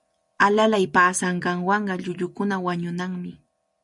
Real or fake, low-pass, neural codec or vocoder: real; 10.8 kHz; none